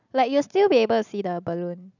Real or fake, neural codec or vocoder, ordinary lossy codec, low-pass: real; none; none; 7.2 kHz